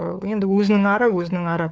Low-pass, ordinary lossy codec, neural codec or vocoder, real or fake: none; none; codec, 16 kHz, 4 kbps, FunCodec, trained on LibriTTS, 50 frames a second; fake